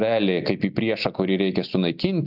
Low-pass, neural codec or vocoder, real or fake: 5.4 kHz; none; real